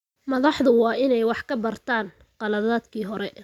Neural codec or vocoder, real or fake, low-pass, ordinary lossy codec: none; real; 19.8 kHz; none